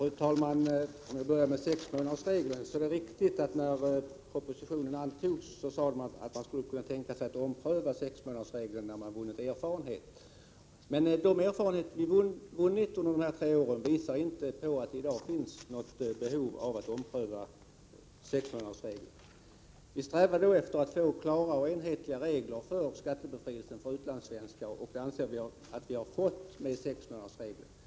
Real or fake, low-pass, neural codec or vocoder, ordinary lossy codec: real; none; none; none